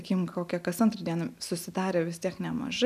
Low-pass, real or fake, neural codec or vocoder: 14.4 kHz; real; none